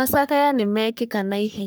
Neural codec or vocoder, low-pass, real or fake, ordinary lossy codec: codec, 44.1 kHz, 3.4 kbps, Pupu-Codec; none; fake; none